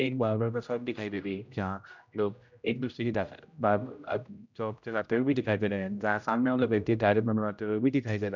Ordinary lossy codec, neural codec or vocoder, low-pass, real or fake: none; codec, 16 kHz, 0.5 kbps, X-Codec, HuBERT features, trained on general audio; 7.2 kHz; fake